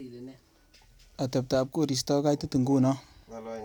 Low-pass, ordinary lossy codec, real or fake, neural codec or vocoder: none; none; real; none